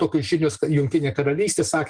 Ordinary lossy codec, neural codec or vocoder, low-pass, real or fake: Opus, 24 kbps; none; 9.9 kHz; real